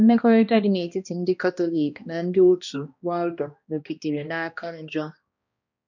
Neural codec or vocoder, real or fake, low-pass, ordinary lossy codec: codec, 16 kHz, 1 kbps, X-Codec, HuBERT features, trained on balanced general audio; fake; 7.2 kHz; none